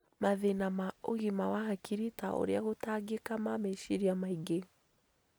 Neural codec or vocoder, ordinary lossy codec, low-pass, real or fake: none; none; none; real